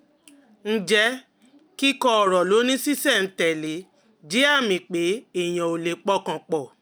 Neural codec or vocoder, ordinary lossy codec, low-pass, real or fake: none; none; none; real